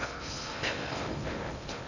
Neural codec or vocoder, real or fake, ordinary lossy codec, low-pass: codec, 16 kHz in and 24 kHz out, 0.6 kbps, FocalCodec, streaming, 2048 codes; fake; none; 7.2 kHz